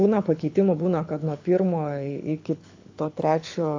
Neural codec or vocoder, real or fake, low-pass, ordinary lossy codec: codec, 24 kHz, 6 kbps, HILCodec; fake; 7.2 kHz; AAC, 48 kbps